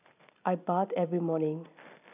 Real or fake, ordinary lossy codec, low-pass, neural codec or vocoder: real; none; 3.6 kHz; none